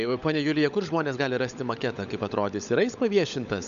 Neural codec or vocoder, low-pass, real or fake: codec, 16 kHz, 16 kbps, FunCodec, trained on LibriTTS, 50 frames a second; 7.2 kHz; fake